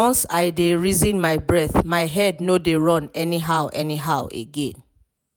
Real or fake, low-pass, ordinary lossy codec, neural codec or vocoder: fake; none; none; vocoder, 48 kHz, 128 mel bands, Vocos